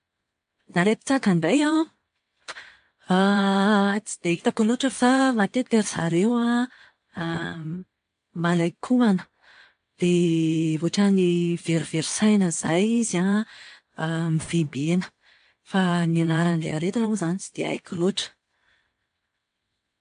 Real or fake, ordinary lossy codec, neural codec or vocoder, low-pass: fake; MP3, 64 kbps; vocoder, 22.05 kHz, 80 mel bands, WaveNeXt; 9.9 kHz